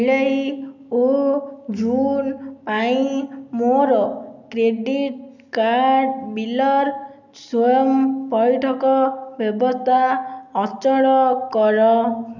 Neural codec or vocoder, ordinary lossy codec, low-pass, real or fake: none; none; 7.2 kHz; real